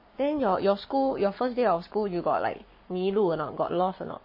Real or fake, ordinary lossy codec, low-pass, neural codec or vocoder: fake; MP3, 24 kbps; 5.4 kHz; codec, 44.1 kHz, 7.8 kbps, Pupu-Codec